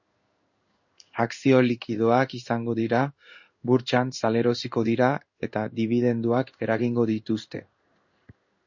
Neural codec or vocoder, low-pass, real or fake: codec, 16 kHz in and 24 kHz out, 1 kbps, XY-Tokenizer; 7.2 kHz; fake